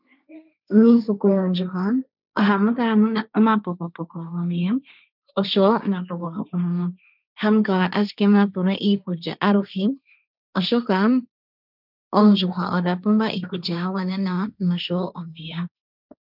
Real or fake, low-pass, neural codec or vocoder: fake; 5.4 kHz; codec, 16 kHz, 1.1 kbps, Voila-Tokenizer